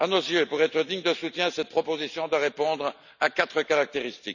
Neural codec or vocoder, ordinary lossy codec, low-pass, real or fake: none; none; 7.2 kHz; real